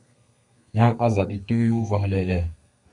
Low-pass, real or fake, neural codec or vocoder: 10.8 kHz; fake; codec, 32 kHz, 1.9 kbps, SNAC